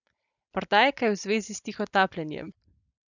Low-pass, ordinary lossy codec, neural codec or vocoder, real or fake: 7.2 kHz; none; none; real